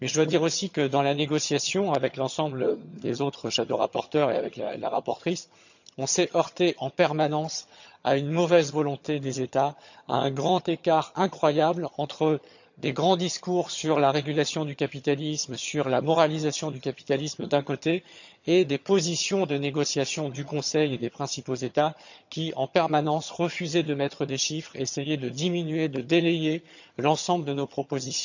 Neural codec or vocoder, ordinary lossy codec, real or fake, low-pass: vocoder, 22.05 kHz, 80 mel bands, HiFi-GAN; none; fake; 7.2 kHz